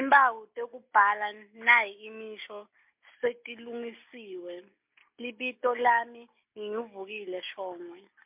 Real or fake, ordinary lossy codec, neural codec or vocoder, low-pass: real; MP3, 32 kbps; none; 3.6 kHz